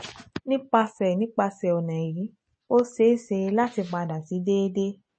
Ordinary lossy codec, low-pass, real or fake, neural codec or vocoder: MP3, 32 kbps; 9.9 kHz; real; none